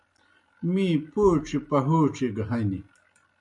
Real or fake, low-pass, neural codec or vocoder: real; 10.8 kHz; none